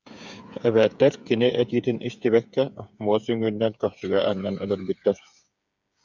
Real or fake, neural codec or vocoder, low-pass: fake; codec, 16 kHz, 8 kbps, FreqCodec, smaller model; 7.2 kHz